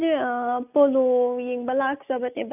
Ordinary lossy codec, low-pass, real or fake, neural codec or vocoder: none; 3.6 kHz; real; none